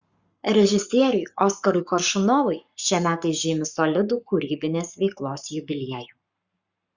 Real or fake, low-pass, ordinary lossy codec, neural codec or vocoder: fake; 7.2 kHz; Opus, 64 kbps; codec, 44.1 kHz, 7.8 kbps, Pupu-Codec